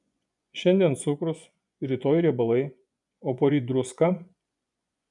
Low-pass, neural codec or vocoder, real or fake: 10.8 kHz; vocoder, 24 kHz, 100 mel bands, Vocos; fake